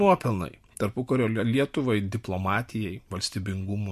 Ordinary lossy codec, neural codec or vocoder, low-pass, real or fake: MP3, 64 kbps; none; 14.4 kHz; real